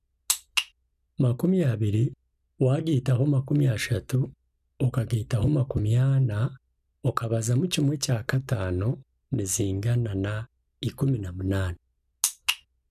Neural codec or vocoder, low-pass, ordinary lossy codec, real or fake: none; 14.4 kHz; none; real